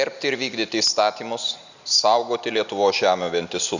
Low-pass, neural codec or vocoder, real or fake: 7.2 kHz; none; real